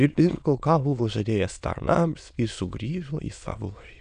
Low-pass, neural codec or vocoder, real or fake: 9.9 kHz; autoencoder, 22.05 kHz, a latent of 192 numbers a frame, VITS, trained on many speakers; fake